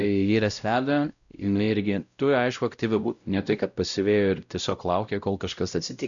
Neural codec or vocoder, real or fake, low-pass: codec, 16 kHz, 0.5 kbps, X-Codec, WavLM features, trained on Multilingual LibriSpeech; fake; 7.2 kHz